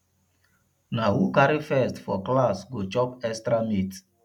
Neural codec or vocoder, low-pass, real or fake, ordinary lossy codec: none; 19.8 kHz; real; none